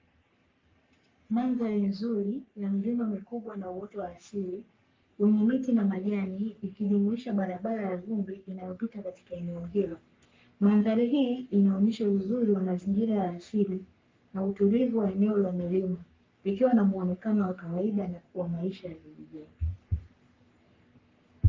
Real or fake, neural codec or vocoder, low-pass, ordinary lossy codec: fake; codec, 44.1 kHz, 3.4 kbps, Pupu-Codec; 7.2 kHz; Opus, 32 kbps